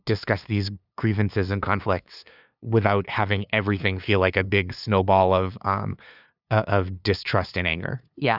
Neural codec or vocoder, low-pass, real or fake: codec, 16 kHz, 2 kbps, FunCodec, trained on LibriTTS, 25 frames a second; 5.4 kHz; fake